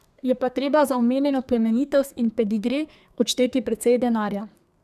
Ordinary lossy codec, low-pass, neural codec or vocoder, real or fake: none; 14.4 kHz; codec, 32 kHz, 1.9 kbps, SNAC; fake